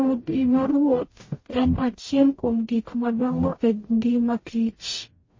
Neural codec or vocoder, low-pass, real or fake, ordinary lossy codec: codec, 44.1 kHz, 0.9 kbps, DAC; 7.2 kHz; fake; MP3, 32 kbps